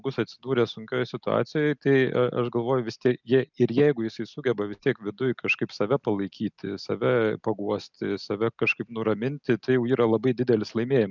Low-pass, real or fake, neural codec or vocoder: 7.2 kHz; real; none